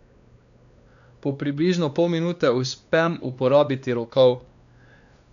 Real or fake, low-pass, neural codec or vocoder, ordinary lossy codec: fake; 7.2 kHz; codec, 16 kHz, 2 kbps, X-Codec, WavLM features, trained on Multilingual LibriSpeech; none